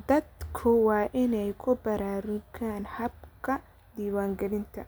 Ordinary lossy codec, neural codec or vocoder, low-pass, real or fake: none; none; none; real